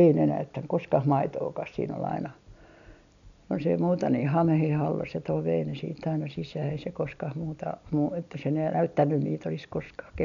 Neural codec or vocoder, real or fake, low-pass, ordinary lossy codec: none; real; 7.2 kHz; none